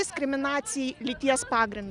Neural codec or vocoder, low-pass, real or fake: none; 10.8 kHz; real